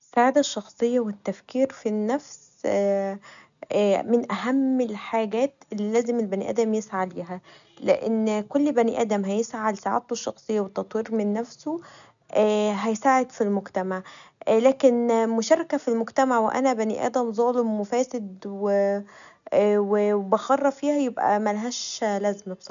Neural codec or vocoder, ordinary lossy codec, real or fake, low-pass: none; none; real; 7.2 kHz